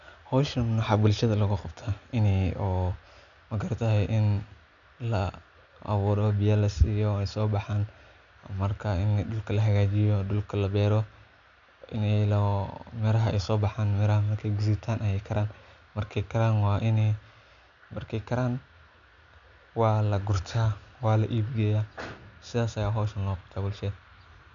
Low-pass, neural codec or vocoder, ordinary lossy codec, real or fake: 7.2 kHz; none; none; real